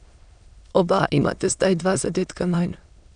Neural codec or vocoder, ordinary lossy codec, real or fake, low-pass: autoencoder, 22.05 kHz, a latent of 192 numbers a frame, VITS, trained on many speakers; none; fake; 9.9 kHz